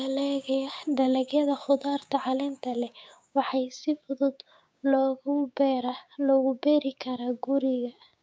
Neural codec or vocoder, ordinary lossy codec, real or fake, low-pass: codec, 16 kHz, 6 kbps, DAC; none; fake; none